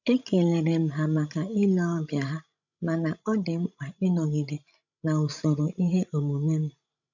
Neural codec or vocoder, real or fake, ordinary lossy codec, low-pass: codec, 16 kHz, 16 kbps, FreqCodec, larger model; fake; none; 7.2 kHz